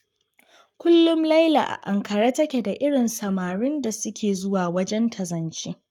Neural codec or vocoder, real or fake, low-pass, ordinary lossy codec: codec, 44.1 kHz, 7.8 kbps, Pupu-Codec; fake; 19.8 kHz; none